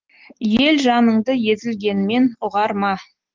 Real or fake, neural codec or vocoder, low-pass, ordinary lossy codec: real; none; 7.2 kHz; Opus, 16 kbps